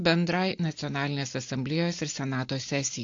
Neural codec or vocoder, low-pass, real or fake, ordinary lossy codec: none; 7.2 kHz; real; AAC, 48 kbps